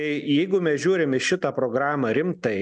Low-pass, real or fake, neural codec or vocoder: 9.9 kHz; real; none